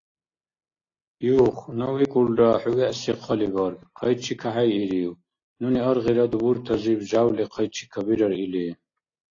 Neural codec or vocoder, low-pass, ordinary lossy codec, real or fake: none; 7.2 kHz; AAC, 32 kbps; real